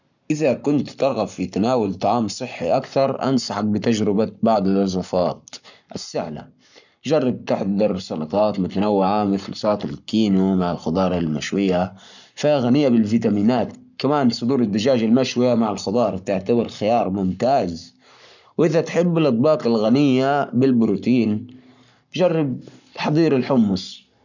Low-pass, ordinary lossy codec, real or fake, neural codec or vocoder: 7.2 kHz; none; fake; codec, 44.1 kHz, 7.8 kbps, Pupu-Codec